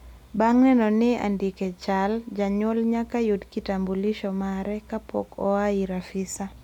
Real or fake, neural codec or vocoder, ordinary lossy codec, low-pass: real; none; none; 19.8 kHz